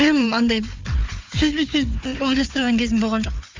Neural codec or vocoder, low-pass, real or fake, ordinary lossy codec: codec, 16 kHz, 4 kbps, FunCodec, trained on Chinese and English, 50 frames a second; 7.2 kHz; fake; MP3, 64 kbps